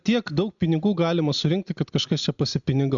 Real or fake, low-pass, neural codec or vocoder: real; 7.2 kHz; none